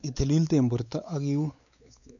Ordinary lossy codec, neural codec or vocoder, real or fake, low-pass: none; codec, 16 kHz, 4 kbps, X-Codec, WavLM features, trained on Multilingual LibriSpeech; fake; 7.2 kHz